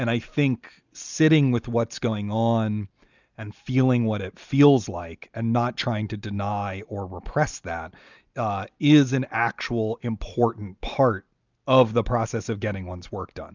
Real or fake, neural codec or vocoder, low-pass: real; none; 7.2 kHz